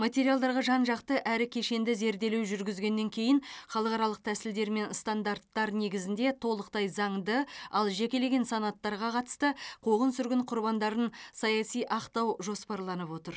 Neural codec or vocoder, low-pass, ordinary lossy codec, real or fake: none; none; none; real